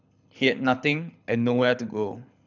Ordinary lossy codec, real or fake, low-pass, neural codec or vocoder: none; fake; 7.2 kHz; codec, 24 kHz, 6 kbps, HILCodec